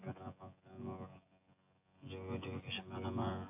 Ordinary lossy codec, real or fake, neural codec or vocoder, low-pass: AAC, 32 kbps; fake; vocoder, 24 kHz, 100 mel bands, Vocos; 3.6 kHz